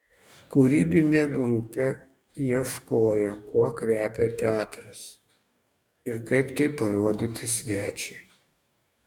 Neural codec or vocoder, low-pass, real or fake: codec, 44.1 kHz, 2.6 kbps, DAC; 19.8 kHz; fake